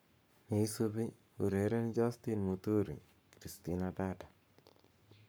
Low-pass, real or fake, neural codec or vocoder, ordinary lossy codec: none; fake; codec, 44.1 kHz, 7.8 kbps, Pupu-Codec; none